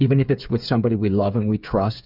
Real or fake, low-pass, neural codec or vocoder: fake; 5.4 kHz; codec, 16 kHz, 8 kbps, FreqCodec, smaller model